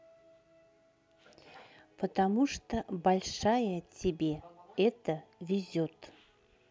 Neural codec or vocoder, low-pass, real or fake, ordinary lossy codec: none; none; real; none